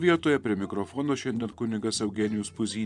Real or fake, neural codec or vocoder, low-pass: fake; vocoder, 24 kHz, 100 mel bands, Vocos; 10.8 kHz